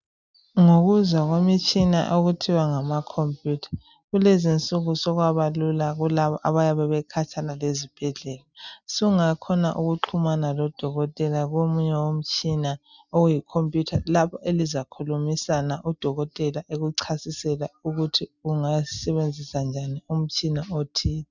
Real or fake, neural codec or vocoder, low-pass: real; none; 7.2 kHz